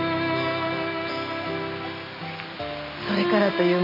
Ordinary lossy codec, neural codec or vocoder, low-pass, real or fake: none; none; 5.4 kHz; real